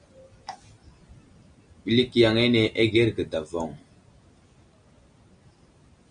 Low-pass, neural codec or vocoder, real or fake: 9.9 kHz; none; real